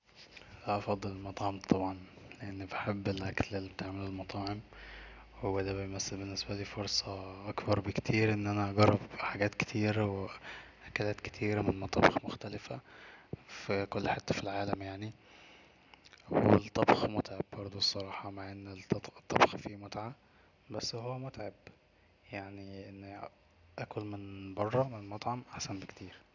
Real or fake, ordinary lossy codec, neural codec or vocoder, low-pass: real; none; none; 7.2 kHz